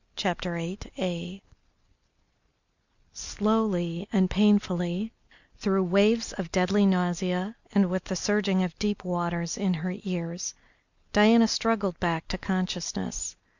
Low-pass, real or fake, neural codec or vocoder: 7.2 kHz; real; none